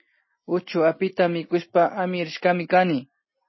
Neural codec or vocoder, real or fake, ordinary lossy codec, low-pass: none; real; MP3, 24 kbps; 7.2 kHz